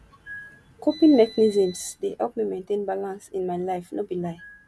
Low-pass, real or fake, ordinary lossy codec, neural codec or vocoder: none; real; none; none